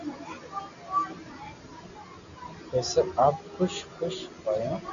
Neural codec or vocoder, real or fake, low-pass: none; real; 7.2 kHz